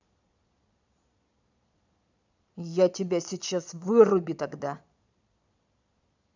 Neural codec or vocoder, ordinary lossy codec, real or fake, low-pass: vocoder, 22.05 kHz, 80 mel bands, Vocos; none; fake; 7.2 kHz